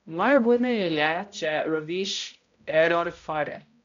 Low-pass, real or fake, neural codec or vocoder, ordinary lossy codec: 7.2 kHz; fake; codec, 16 kHz, 0.5 kbps, X-Codec, HuBERT features, trained on balanced general audio; MP3, 64 kbps